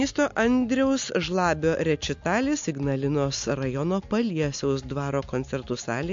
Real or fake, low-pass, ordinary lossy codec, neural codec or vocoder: real; 7.2 kHz; MP3, 48 kbps; none